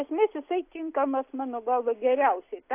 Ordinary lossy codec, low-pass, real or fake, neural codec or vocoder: AAC, 24 kbps; 3.6 kHz; real; none